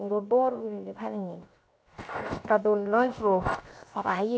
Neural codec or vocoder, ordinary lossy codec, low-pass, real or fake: codec, 16 kHz, 0.7 kbps, FocalCodec; none; none; fake